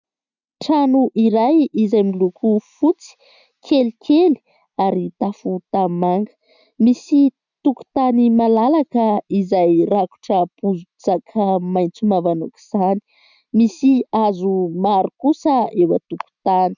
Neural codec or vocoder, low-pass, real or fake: none; 7.2 kHz; real